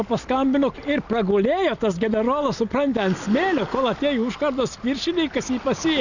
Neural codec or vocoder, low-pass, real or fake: none; 7.2 kHz; real